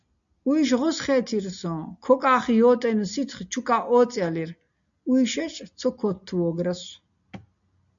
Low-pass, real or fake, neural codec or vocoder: 7.2 kHz; real; none